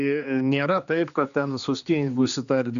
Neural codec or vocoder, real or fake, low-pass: codec, 16 kHz, 2 kbps, X-Codec, HuBERT features, trained on general audio; fake; 7.2 kHz